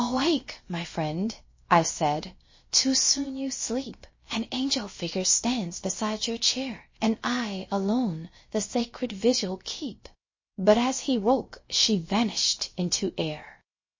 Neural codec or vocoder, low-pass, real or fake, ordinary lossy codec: codec, 16 kHz, about 1 kbps, DyCAST, with the encoder's durations; 7.2 kHz; fake; MP3, 32 kbps